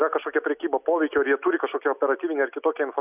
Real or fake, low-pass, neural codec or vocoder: real; 3.6 kHz; none